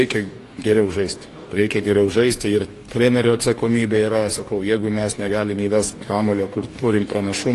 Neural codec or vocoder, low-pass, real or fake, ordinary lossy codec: codec, 44.1 kHz, 2.6 kbps, DAC; 14.4 kHz; fake; AAC, 48 kbps